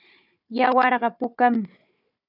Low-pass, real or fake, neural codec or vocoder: 5.4 kHz; fake; codec, 16 kHz, 4 kbps, FunCodec, trained on Chinese and English, 50 frames a second